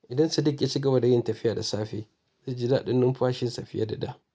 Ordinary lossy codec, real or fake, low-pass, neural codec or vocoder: none; real; none; none